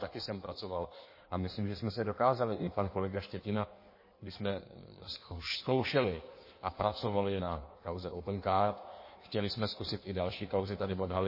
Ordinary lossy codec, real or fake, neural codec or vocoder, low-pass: MP3, 24 kbps; fake; codec, 16 kHz in and 24 kHz out, 1.1 kbps, FireRedTTS-2 codec; 5.4 kHz